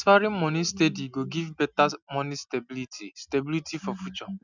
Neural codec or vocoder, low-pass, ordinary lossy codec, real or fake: none; 7.2 kHz; none; real